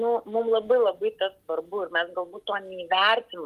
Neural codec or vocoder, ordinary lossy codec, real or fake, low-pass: none; Opus, 24 kbps; real; 14.4 kHz